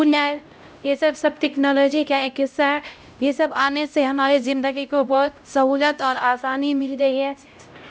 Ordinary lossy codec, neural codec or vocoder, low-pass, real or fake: none; codec, 16 kHz, 0.5 kbps, X-Codec, HuBERT features, trained on LibriSpeech; none; fake